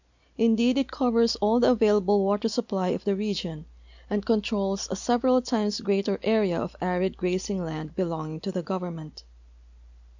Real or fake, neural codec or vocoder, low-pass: real; none; 7.2 kHz